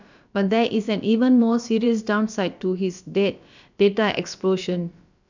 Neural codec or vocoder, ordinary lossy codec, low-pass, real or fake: codec, 16 kHz, about 1 kbps, DyCAST, with the encoder's durations; none; 7.2 kHz; fake